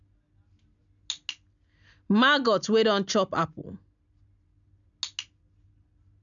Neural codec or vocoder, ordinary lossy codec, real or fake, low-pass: none; none; real; 7.2 kHz